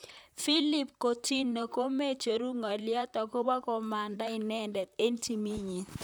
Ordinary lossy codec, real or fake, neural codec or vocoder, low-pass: none; fake; vocoder, 44.1 kHz, 128 mel bands, Pupu-Vocoder; none